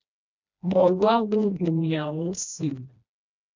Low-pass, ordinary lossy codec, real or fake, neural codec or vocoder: 7.2 kHz; MP3, 64 kbps; fake; codec, 16 kHz, 1 kbps, FreqCodec, smaller model